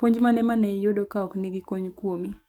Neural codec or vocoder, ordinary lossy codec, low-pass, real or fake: codec, 44.1 kHz, 7.8 kbps, DAC; none; 19.8 kHz; fake